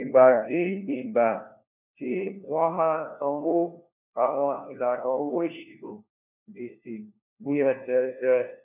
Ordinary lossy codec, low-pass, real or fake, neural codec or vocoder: none; 3.6 kHz; fake; codec, 16 kHz, 1 kbps, FunCodec, trained on LibriTTS, 50 frames a second